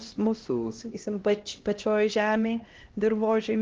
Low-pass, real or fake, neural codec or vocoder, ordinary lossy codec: 7.2 kHz; fake; codec, 16 kHz, 1 kbps, X-Codec, HuBERT features, trained on LibriSpeech; Opus, 16 kbps